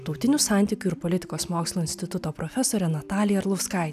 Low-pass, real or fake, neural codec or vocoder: 14.4 kHz; real; none